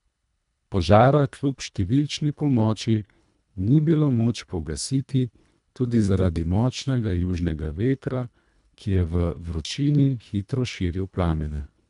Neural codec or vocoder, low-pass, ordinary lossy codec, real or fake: codec, 24 kHz, 1.5 kbps, HILCodec; 10.8 kHz; none; fake